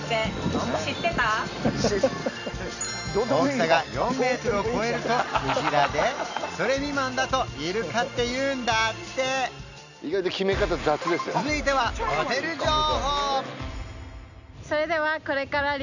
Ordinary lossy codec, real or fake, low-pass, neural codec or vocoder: none; real; 7.2 kHz; none